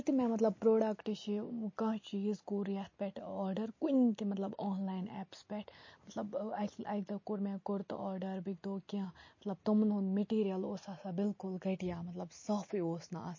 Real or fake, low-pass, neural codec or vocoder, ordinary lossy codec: real; 7.2 kHz; none; MP3, 32 kbps